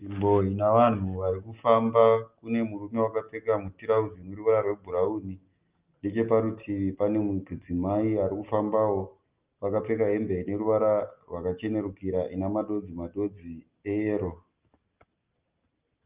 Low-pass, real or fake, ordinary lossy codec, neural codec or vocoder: 3.6 kHz; real; Opus, 32 kbps; none